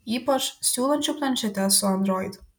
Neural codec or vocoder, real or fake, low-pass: none; real; 19.8 kHz